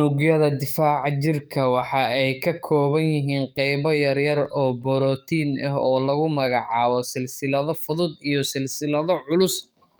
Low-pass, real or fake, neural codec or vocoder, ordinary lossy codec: none; fake; codec, 44.1 kHz, 7.8 kbps, DAC; none